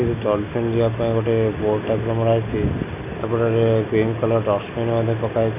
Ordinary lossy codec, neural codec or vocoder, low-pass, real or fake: none; none; 3.6 kHz; real